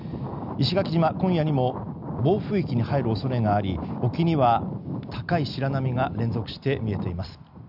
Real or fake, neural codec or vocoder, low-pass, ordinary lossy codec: real; none; 5.4 kHz; none